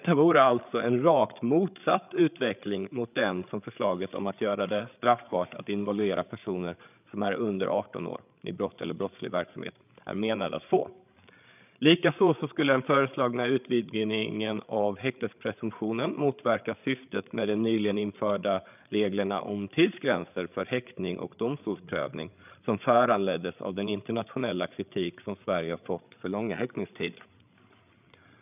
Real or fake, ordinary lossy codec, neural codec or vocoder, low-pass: fake; none; codec, 16 kHz, 8 kbps, FreqCodec, larger model; 3.6 kHz